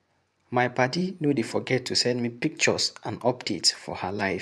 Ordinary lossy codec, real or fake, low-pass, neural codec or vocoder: none; fake; none; vocoder, 24 kHz, 100 mel bands, Vocos